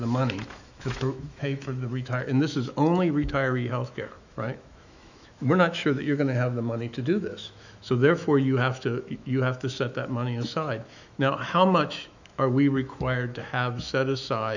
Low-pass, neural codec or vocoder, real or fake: 7.2 kHz; autoencoder, 48 kHz, 128 numbers a frame, DAC-VAE, trained on Japanese speech; fake